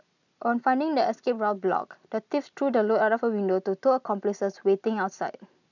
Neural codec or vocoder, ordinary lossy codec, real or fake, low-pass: none; none; real; 7.2 kHz